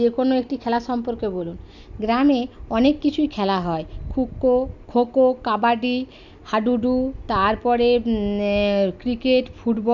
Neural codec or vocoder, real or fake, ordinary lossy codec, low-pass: none; real; none; 7.2 kHz